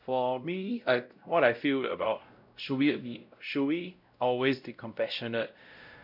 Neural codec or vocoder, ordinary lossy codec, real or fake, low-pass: codec, 16 kHz, 0.5 kbps, X-Codec, WavLM features, trained on Multilingual LibriSpeech; none; fake; 5.4 kHz